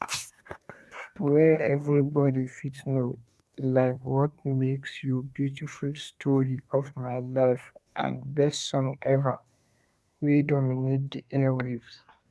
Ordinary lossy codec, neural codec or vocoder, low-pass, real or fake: none; codec, 24 kHz, 1 kbps, SNAC; none; fake